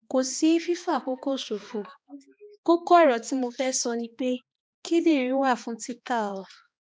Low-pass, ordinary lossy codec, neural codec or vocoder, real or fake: none; none; codec, 16 kHz, 2 kbps, X-Codec, HuBERT features, trained on balanced general audio; fake